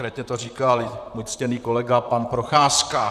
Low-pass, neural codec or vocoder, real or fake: 14.4 kHz; vocoder, 44.1 kHz, 128 mel bands, Pupu-Vocoder; fake